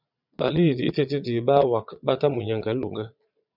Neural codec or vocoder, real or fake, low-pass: vocoder, 22.05 kHz, 80 mel bands, Vocos; fake; 5.4 kHz